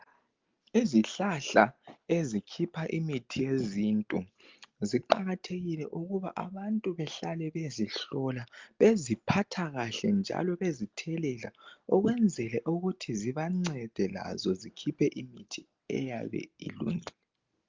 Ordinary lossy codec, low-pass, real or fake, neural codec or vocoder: Opus, 24 kbps; 7.2 kHz; real; none